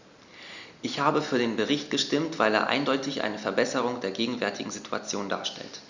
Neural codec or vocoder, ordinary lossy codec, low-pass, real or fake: none; Opus, 64 kbps; 7.2 kHz; real